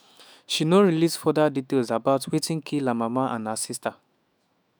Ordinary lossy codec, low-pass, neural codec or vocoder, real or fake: none; none; autoencoder, 48 kHz, 128 numbers a frame, DAC-VAE, trained on Japanese speech; fake